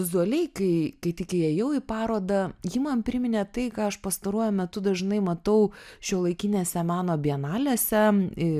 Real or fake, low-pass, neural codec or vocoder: real; 14.4 kHz; none